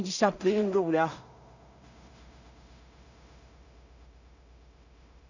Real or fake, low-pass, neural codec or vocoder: fake; 7.2 kHz; codec, 16 kHz in and 24 kHz out, 0.4 kbps, LongCat-Audio-Codec, two codebook decoder